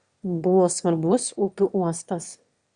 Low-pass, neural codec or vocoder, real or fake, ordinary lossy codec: 9.9 kHz; autoencoder, 22.05 kHz, a latent of 192 numbers a frame, VITS, trained on one speaker; fake; Opus, 64 kbps